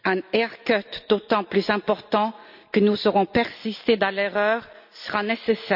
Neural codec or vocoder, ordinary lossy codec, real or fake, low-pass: none; none; real; 5.4 kHz